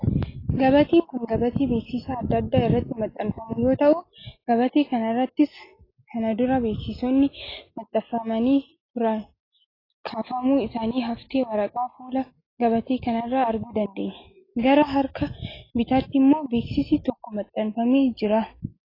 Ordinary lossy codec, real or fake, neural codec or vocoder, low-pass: AAC, 24 kbps; real; none; 5.4 kHz